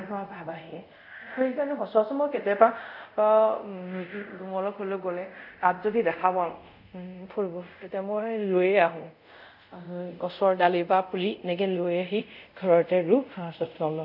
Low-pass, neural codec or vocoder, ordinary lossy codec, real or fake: 5.4 kHz; codec, 24 kHz, 0.5 kbps, DualCodec; none; fake